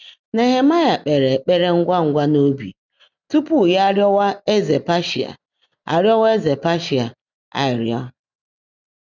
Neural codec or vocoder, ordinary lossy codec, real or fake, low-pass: none; none; real; 7.2 kHz